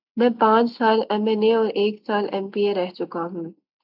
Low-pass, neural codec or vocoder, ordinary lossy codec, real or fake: 5.4 kHz; none; MP3, 48 kbps; real